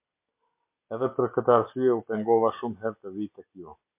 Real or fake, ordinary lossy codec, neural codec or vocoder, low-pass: real; MP3, 24 kbps; none; 3.6 kHz